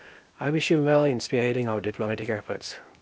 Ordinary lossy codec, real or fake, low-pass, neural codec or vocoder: none; fake; none; codec, 16 kHz, 0.8 kbps, ZipCodec